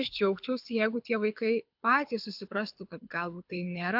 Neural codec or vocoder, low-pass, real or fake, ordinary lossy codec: codec, 24 kHz, 6 kbps, HILCodec; 5.4 kHz; fake; AAC, 48 kbps